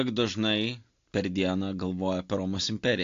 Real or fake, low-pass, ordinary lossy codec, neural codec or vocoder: real; 7.2 kHz; AAC, 48 kbps; none